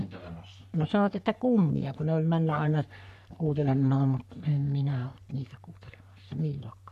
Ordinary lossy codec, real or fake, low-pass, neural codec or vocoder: none; fake; 14.4 kHz; codec, 44.1 kHz, 3.4 kbps, Pupu-Codec